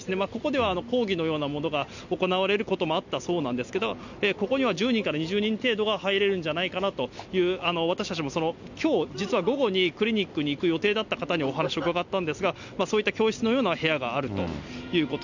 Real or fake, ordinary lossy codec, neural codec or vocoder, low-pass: real; none; none; 7.2 kHz